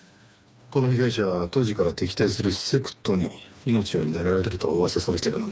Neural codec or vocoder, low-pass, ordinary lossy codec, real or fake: codec, 16 kHz, 2 kbps, FreqCodec, smaller model; none; none; fake